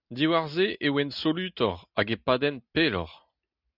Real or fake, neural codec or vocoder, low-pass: real; none; 5.4 kHz